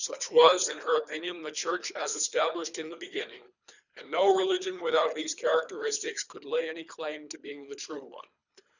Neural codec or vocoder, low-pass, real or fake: codec, 24 kHz, 3 kbps, HILCodec; 7.2 kHz; fake